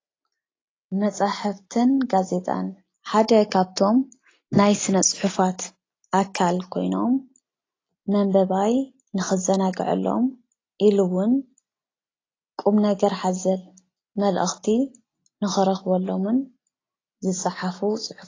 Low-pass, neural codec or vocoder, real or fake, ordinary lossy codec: 7.2 kHz; none; real; AAC, 32 kbps